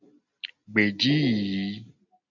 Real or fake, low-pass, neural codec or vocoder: real; 7.2 kHz; none